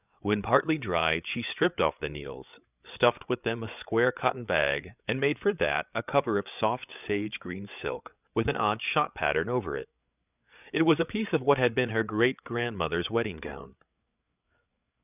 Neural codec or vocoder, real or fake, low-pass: codec, 16 kHz, 8 kbps, FreqCodec, larger model; fake; 3.6 kHz